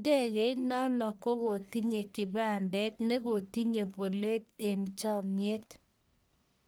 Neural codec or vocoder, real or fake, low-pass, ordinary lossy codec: codec, 44.1 kHz, 1.7 kbps, Pupu-Codec; fake; none; none